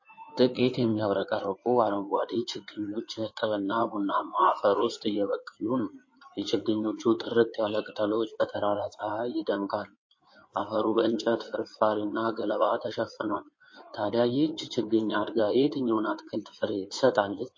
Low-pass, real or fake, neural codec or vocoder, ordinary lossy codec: 7.2 kHz; fake; codec, 16 kHz in and 24 kHz out, 2.2 kbps, FireRedTTS-2 codec; MP3, 32 kbps